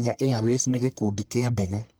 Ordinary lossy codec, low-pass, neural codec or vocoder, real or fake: none; none; codec, 44.1 kHz, 1.7 kbps, Pupu-Codec; fake